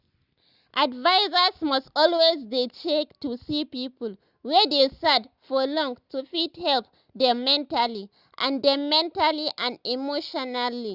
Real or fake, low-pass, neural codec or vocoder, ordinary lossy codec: real; 5.4 kHz; none; Opus, 64 kbps